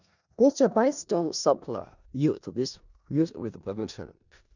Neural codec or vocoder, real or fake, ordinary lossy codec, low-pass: codec, 16 kHz in and 24 kHz out, 0.4 kbps, LongCat-Audio-Codec, four codebook decoder; fake; none; 7.2 kHz